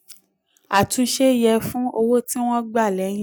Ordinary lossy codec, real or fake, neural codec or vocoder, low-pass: none; real; none; none